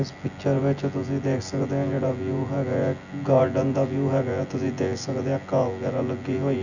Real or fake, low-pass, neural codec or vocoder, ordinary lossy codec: fake; 7.2 kHz; vocoder, 24 kHz, 100 mel bands, Vocos; none